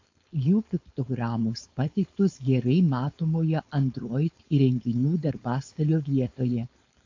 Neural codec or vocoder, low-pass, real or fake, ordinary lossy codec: codec, 16 kHz, 4.8 kbps, FACodec; 7.2 kHz; fake; AAC, 48 kbps